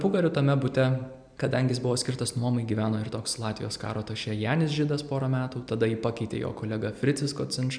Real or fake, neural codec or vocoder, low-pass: real; none; 9.9 kHz